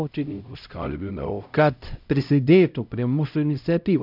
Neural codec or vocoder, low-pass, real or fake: codec, 16 kHz, 0.5 kbps, X-Codec, HuBERT features, trained on LibriSpeech; 5.4 kHz; fake